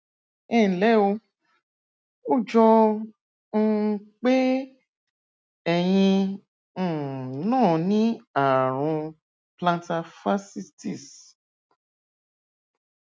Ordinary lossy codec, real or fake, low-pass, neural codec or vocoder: none; real; none; none